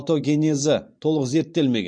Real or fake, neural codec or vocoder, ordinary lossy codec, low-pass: fake; vocoder, 44.1 kHz, 128 mel bands every 512 samples, BigVGAN v2; MP3, 48 kbps; 9.9 kHz